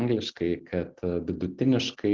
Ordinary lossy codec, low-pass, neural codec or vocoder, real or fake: Opus, 16 kbps; 7.2 kHz; none; real